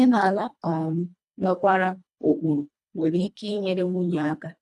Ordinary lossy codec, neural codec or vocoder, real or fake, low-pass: none; codec, 24 kHz, 1.5 kbps, HILCodec; fake; none